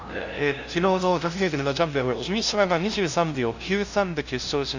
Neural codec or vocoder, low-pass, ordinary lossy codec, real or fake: codec, 16 kHz, 0.5 kbps, FunCodec, trained on LibriTTS, 25 frames a second; 7.2 kHz; Opus, 64 kbps; fake